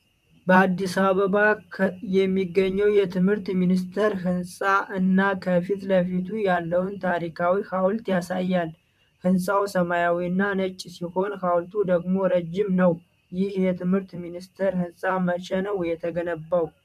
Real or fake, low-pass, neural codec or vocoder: fake; 14.4 kHz; vocoder, 44.1 kHz, 128 mel bands, Pupu-Vocoder